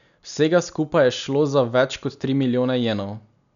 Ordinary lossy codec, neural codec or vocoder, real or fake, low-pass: none; none; real; 7.2 kHz